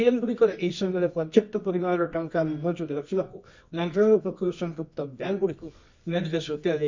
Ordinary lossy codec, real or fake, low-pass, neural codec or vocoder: MP3, 64 kbps; fake; 7.2 kHz; codec, 24 kHz, 0.9 kbps, WavTokenizer, medium music audio release